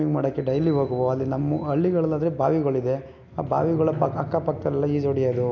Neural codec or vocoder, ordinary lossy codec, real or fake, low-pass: none; AAC, 48 kbps; real; 7.2 kHz